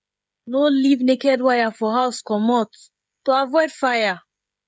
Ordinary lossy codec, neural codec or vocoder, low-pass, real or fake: none; codec, 16 kHz, 16 kbps, FreqCodec, smaller model; none; fake